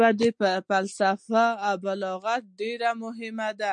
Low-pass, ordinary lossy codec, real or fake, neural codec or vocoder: 9.9 kHz; MP3, 64 kbps; real; none